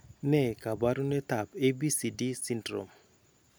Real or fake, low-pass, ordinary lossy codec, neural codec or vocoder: real; none; none; none